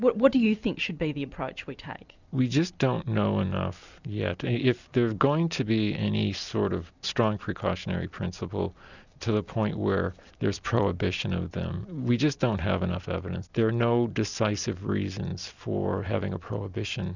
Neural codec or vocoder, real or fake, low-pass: none; real; 7.2 kHz